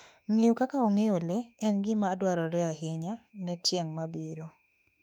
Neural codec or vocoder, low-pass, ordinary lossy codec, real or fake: autoencoder, 48 kHz, 32 numbers a frame, DAC-VAE, trained on Japanese speech; 19.8 kHz; none; fake